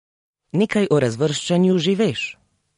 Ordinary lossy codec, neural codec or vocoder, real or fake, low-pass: MP3, 48 kbps; none; real; 10.8 kHz